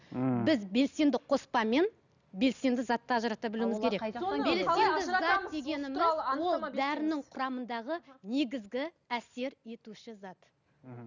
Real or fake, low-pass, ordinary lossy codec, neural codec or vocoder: real; 7.2 kHz; none; none